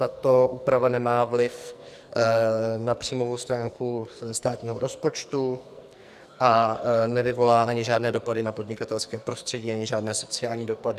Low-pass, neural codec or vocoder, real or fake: 14.4 kHz; codec, 44.1 kHz, 2.6 kbps, SNAC; fake